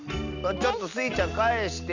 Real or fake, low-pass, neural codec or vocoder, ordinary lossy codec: real; 7.2 kHz; none; none